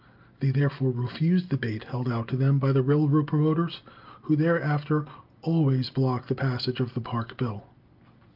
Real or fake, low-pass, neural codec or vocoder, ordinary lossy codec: real; 5.4 kHz; none; Opus, 32 kbps